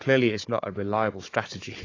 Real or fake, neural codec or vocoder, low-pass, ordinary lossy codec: fake; codec, 16 kHz, 4 kbps, FunCodec, trained on Chinese and English, 50 frames a second; 7.2 kHz; AAC, 32 kbps